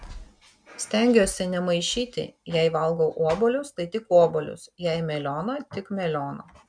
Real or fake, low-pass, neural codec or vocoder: real; 9.9 kHz; none